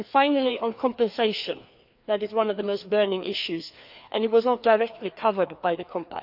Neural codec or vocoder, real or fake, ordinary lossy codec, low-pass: codec, 16 kHz, 2 kbps, FreqCodec, larger model; fake; AAC, 48 kbps; 5.4 kHz